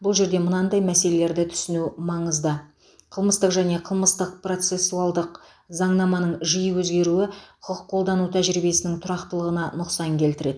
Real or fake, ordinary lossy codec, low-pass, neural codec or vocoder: real; none; none; none